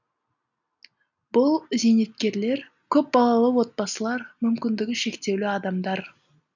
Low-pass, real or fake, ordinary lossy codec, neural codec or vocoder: 7.2 kHz; real; none; none